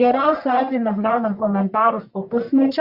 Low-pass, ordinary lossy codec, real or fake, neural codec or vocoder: 5.4 kHz; Opus, 64 kbps; fake; codec, 44.1 kHz, 1.7 kbps, Pupu-Codec